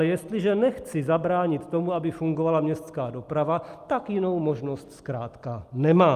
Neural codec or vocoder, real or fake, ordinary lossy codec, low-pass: none; real; Opus, 32 kbps; 14.4 kHz